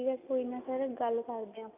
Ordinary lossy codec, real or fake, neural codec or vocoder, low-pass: none; real; none; 3.6 kHz